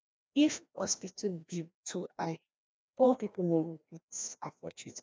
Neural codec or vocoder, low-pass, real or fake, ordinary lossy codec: codec, 16 kHz, 1 kbps, FreqCodec, larger model; none; fake; none